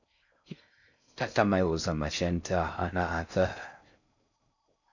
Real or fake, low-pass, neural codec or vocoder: fake; 7.2 kHz; codec, 16 kHz in and 24 kHz out, 0.6 kbps, FocalCodec, streaming, 4096 codes